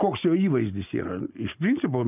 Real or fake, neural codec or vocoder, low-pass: real; none; 3.6 kHz